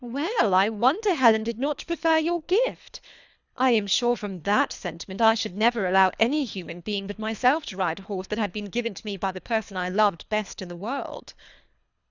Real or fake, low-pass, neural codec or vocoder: fake; 7.2 kHz; codec, 24 kHz, 3 kbps, HILCodec